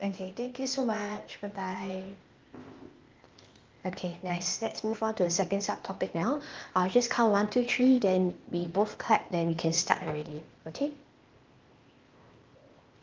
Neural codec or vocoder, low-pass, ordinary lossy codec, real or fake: codec, 16 kHz, 0.8 kbps, ZipCodec; 7.2 kHz; Opus, 32 kbps; fake